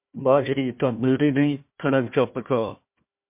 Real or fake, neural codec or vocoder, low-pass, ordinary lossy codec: fake; codec, 16 kHz, 1 kbps, FunCodec, trained on Chinese and English, 50 frames a second; 3.6 kHz; MP3, 32 kbps